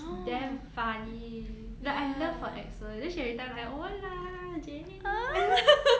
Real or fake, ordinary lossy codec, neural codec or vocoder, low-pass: real; none; none; none